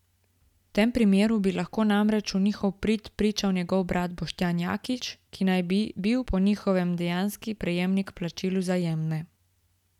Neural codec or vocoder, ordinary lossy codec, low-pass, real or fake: none; none; 19.8 kHz; real